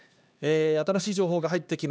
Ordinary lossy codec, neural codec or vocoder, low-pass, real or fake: none; codec, 16 kHz, 2 kbps, X-Codec, HuBERT features, trained on LibriSpeech; none; fake